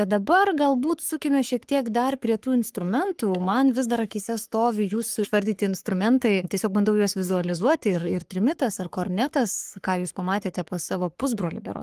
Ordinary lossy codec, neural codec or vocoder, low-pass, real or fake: Opus, 24 kbps; codec, 44.1 kHz, 3.4 kbps, Pupu-Codec; 14.4 kHz; fake